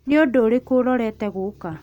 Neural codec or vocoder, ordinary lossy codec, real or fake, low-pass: none; none; real; 19.8 kHz